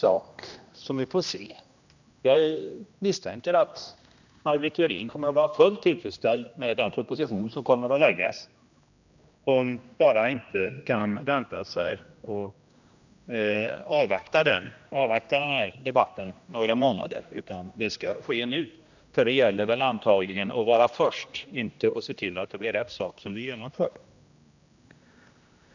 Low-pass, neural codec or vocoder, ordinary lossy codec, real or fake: 7.2 kHz; codec, 16 kHz, 1 kbps, X-Codec, HuBERT features, trained on general audio; none; fake